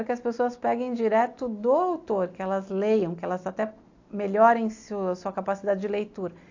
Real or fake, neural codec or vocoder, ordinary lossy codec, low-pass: real; none; AAC, 48 kbps; 7.2 kHz